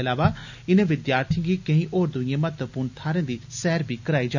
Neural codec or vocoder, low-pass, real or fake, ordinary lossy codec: none; 7.2 kHz; real; none